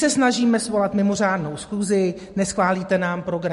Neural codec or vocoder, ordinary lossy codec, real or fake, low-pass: none; MP3, 48 kbps; real; 14.4 kHz